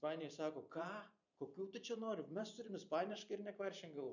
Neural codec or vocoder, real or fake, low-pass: vocoder, 44.1 kHz, 128 mel bands every 512 samples, BigVGAN v2; fake; 7.2 kHz